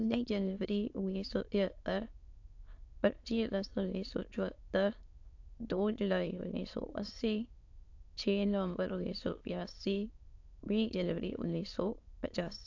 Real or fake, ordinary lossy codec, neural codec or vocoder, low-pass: fake; AAC, 48 kbps; autoencoder, 22.05 kHz, a latent of 192 numbers a frame, VITS, trained on many speakers; 7.2 kHz